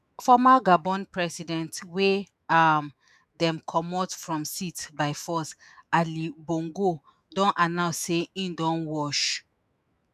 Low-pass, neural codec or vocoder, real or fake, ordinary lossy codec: 14.4 kHz; autoencoder, 48 kHz, 128 numbers a frame, DAC-VAE, trained on Japanese speech; fake; none